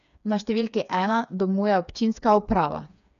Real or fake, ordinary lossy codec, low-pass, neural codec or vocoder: fake; none; 7.2 kHz; codec, 16 kHz, 4 kbps, FreqCodec, smaller model